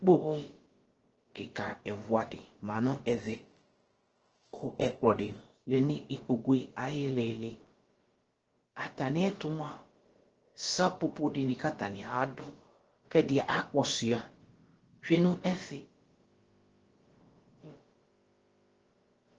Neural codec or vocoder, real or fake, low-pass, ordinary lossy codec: codec, 16 kHz, about 1 kbps, DyCAST, with the encoder's durations; fake; 7.2 kHz; Opus, 16 kbps